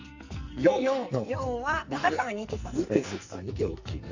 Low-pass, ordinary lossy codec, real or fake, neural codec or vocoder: 7.2 kHz; Opus, 64 kbps; fake; codec, 44.1 kHz, 2.6 kbps, SNAC